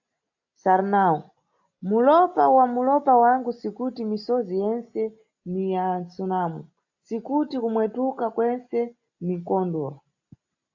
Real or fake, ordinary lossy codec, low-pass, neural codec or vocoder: real; AAC, 48 kbps; 7.2 kHz; none